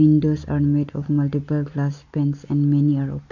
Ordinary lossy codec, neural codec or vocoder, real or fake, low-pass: none; none; real; 7.2 kHz